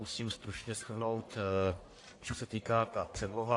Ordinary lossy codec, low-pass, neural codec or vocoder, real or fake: AAC, 48 kbps; 10.8 kHz; codec, 44.1 kHz, 1.7 kbps, Pupu-Codec; fake